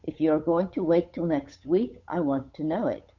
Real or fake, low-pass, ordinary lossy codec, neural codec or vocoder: fake; 7.2 kHz; Opus, 64 kbps; codec, 16 kHz, 8 kbps, FunCodec, trained on Chinese and English, 25 frames a second